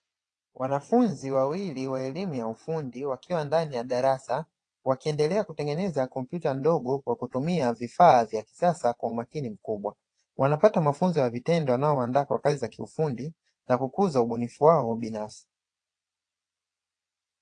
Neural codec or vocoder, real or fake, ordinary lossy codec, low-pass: vocoder, 22.05 kHz, 80 mel bands, WaveNeXt; fake; AAC, 48 kbps; 9.9 kHz